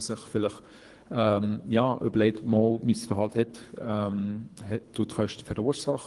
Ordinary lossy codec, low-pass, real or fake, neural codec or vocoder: Opus, 24 kbps; 10.8 kHz; fake; codec, 24 kHz, 3 kbps, HILCodec